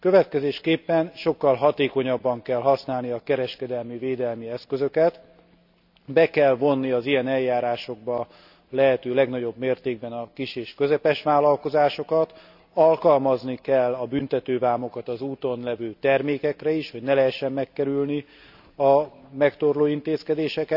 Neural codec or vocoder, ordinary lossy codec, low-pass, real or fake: none; none; 5.4 kHz; real